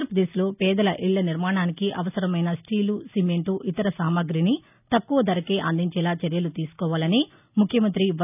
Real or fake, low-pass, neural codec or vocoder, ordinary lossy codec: real; 3.6 kHz; none; none